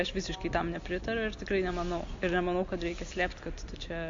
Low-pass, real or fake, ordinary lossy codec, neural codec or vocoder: 7.2 kHz; real; MP3, 48 kbps; none